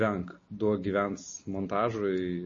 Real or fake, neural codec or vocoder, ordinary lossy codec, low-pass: real; none; MP3, 32 kbps; 7.2 kHz